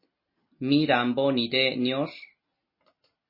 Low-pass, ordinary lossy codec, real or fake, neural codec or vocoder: 5.4 kHz; MP3, 24 kbps; real; none